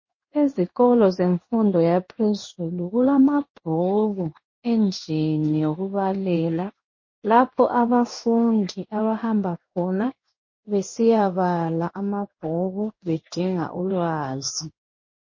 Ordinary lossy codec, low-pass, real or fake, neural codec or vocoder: MP3, 32 kbps; 7.2 kHz; fake; codec, 16 kHz in and 24 kHz out, 1 kbps, XY-Tokenizer